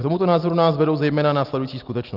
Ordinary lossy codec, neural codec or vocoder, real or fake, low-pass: Opus, 24 kbps; none; real; 5.4 kHz